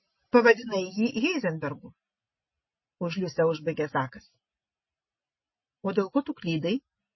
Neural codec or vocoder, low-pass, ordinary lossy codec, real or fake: none; 7.2 kHz; MP3, 24 kbps; real